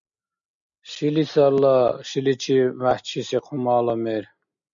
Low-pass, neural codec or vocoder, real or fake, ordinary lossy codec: 7.2 kHz; none; real; MP3, 64 kbps